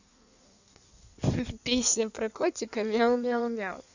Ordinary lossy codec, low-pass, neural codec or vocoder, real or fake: none; 7.2 kHz; codec, 16 kHz in and 24 kHz out, 1.1 kbps, FireRedTTS-2 codec; fake